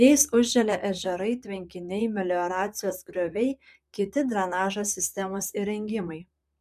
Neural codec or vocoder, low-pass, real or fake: vocoder, 44.1 kHz, 128 mel bands, Pupu-Vocoder; 14.4 kHz; fake